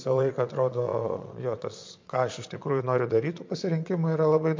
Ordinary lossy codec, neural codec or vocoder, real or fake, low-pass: MP3, 48 kbps; vocoder, 22.05 kHz, 80 mel bands, Vocos; fake; 7.2 kHz